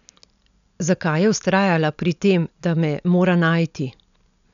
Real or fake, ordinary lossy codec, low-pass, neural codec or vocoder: real; none; 7.2 kHz; none